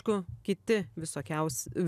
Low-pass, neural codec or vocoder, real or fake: 14.4 kHz; none; real